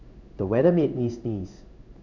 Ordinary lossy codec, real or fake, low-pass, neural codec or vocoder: none; fake; 7.2 kHz; codec, 16 kHz in and 24 kHz out, 1 kbps, XY-Tokenizer